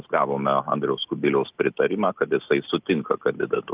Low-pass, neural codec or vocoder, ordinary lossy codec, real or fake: 3.6 kHz; none; Opus, 24 kbps; real